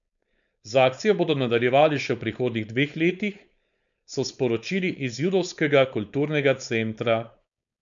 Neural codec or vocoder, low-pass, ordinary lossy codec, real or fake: codec, 16 kHz, 4.8 kbps, FACodec; 7.2 kHz; none; fake